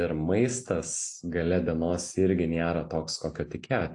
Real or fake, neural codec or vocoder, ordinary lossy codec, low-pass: real; none; AAC, 48 kbps; 10.8 kHz